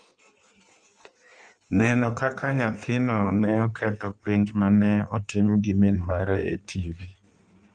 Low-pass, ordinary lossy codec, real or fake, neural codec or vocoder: 9.9 kHz; Opus, 32 kbps; fake; codec, 16 kHz in and 24 kHz out, 1.1 kbps, FireRedTTS-2 codec